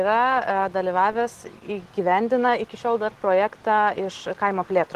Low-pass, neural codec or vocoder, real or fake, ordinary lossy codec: 14.4 kHz; none; real; Opus, 16 kbps